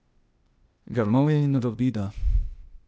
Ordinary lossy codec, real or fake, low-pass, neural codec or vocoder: none; fake; none; codec, 16 kHz, 0.8 kbps, ZipCodec